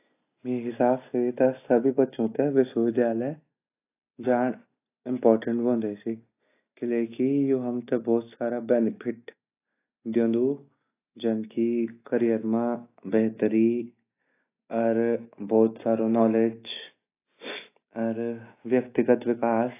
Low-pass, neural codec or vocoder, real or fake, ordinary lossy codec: 3.6 kHz; none; real; AAC, 24 kbps